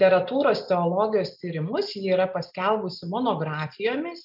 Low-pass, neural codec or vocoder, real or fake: 5.4 kHz; none; real